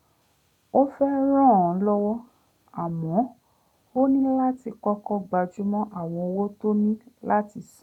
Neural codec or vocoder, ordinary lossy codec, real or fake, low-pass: none; none; real; 19.8 kHz